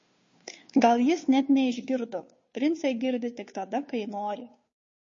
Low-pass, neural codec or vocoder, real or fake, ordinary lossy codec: 7.2 kHz; codec, 16 kHz, 2 kbps, FunCodec, trained on Chinese and English, 25 frames a second; fake; MP3, 32 kbps